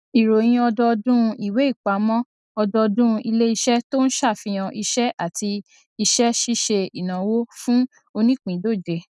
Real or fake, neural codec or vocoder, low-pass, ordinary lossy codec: real; none; none; none